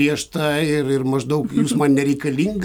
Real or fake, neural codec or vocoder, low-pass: real; none; 19.8 kHz